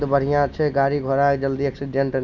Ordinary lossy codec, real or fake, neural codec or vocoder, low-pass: none; real; none; 7.2 kHz